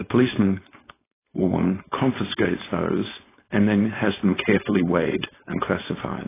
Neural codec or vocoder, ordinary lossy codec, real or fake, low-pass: codec, 16 kHz, 4.8 kbps, FACodec; AAC, 16 kbps; fake; 3.6 kHz